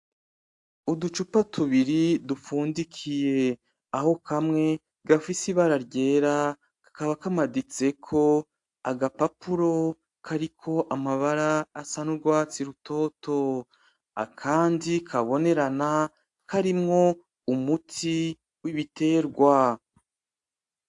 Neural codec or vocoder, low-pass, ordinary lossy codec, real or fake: none; 10.8 kHz; AAC, 64 kbps; real